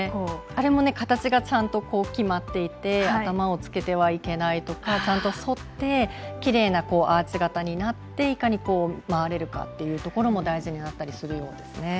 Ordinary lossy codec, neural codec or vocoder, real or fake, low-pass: none; none; real; none